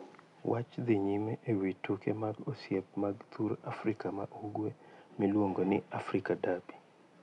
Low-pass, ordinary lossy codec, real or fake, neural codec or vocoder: 10.8 kHz; none; real; none